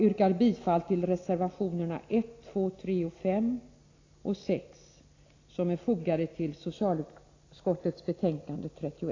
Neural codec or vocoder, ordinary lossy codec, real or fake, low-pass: none; AAC, 32 kbps; real; 7.2 kHz